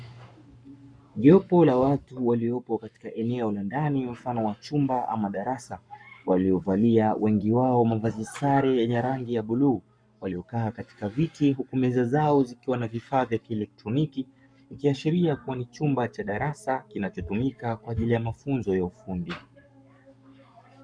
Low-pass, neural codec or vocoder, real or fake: 9.9 kHz; codec, 44.1 kHz, 7.8 kbps, Pupu-Codec; fake